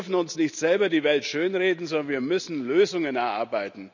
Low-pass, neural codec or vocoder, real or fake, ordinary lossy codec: 7.2 kHz; none; real; none